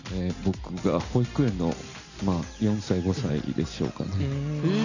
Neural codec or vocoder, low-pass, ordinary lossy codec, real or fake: none; 7.2 kHz; none; real